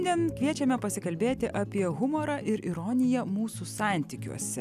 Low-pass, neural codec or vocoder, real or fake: 14.4 kHz; none; real